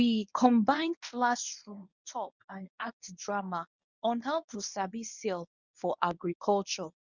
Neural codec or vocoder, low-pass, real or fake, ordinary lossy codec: codec, 24 kHz, 0.9 kbps, WavTokenizer, medium speech release version 1; 7.2 kHz; fake; none